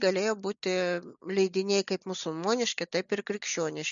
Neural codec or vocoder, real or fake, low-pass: none; real; 7.2 kHz